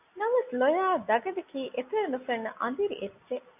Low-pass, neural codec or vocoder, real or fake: 3.6 kHz; none; real